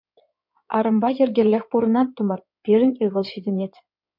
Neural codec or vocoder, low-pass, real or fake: codec, 16 kHz in and 24 kHz out, 2.2 kbps, FireRedTTS-2 codec; 5.4 kHz; fake